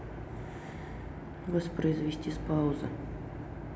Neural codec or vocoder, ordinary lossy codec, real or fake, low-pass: none; none; real; none